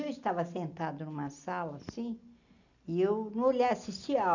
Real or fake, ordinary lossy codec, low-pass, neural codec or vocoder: real; none; 7.2 kHz; none